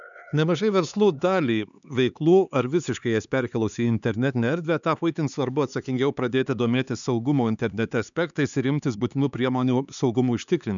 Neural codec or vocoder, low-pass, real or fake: codec, 16 kHz, 4 kbps, X-Codec, HuBERT features, trained on LibriSpeech; 7.2 kHz; fake